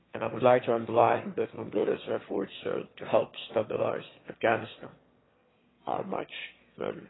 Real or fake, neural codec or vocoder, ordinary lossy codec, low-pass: fake; autoencoder, 22.05 kHz, a latent of 192 numbers a frame, VITS, trained on one speaker; AAC, 16 kbps; 7.2 kHz